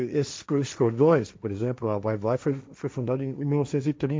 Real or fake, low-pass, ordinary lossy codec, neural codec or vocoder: fake; none; none; codec, 16 kHz, 1.1 kbps, Voila-Tokenizer